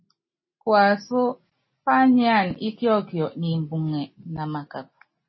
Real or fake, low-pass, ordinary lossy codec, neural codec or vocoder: real; 7.2 kHz; MP3, 24 kbps; none